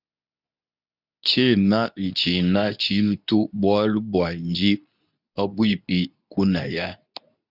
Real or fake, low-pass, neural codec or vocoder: fake; 5.4 kHz; codec, 24 kHz, 0.9 kbps, WavTokenizer, medium speech release version 2